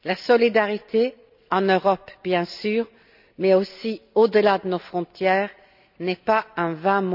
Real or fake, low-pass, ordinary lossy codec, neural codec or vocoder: real; 5.4 kHz; none; none